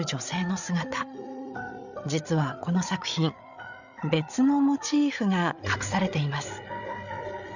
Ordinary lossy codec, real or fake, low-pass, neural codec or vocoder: none; fake; 7.2 kHz; codec, 16 kHz, 8 kbps, FreqCodec, larger model